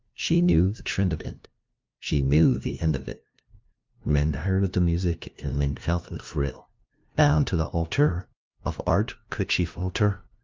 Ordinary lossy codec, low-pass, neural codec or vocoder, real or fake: Opus, 24 kbps; 7.2 kHz; codec, 16 kHz, 0.5 kbps, FunCodec, trained on LibriTTS, 25 frames a second; fake